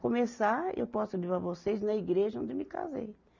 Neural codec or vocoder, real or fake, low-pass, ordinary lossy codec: none; real; 7.2 kHz; none